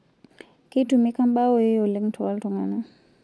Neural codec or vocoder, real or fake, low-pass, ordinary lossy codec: none; real; none; none